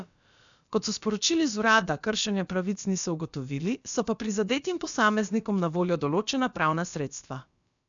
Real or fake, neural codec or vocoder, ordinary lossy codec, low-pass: fake; codec, 16 kHz, about 1 kbps, DyCAST, with the encoder's durations; none; 7.2 kHz